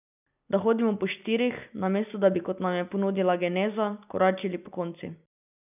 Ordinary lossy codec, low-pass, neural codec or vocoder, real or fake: none; 3.6 kHz; none; real